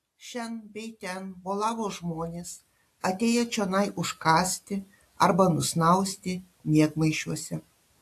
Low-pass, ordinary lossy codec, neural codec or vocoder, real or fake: 14.4 kHz; AAC, 64 kbps; none; real